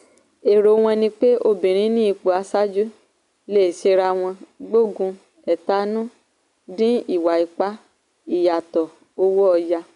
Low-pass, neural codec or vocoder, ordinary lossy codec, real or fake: 10.8 kHz; none; none; real